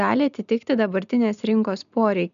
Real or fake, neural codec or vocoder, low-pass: real; none; 7.2 kHz